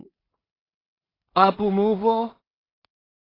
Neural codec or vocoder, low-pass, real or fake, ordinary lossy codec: codec, 16 kHz in and 24 kHz out, 0.4 kbps, LongCat-Audio-Codec, two codebook decoder; 5.4 kHz; fake; AAC, 24 kbps